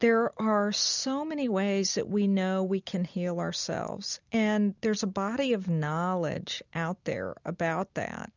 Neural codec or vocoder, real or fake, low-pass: none; real; 7.2 kHz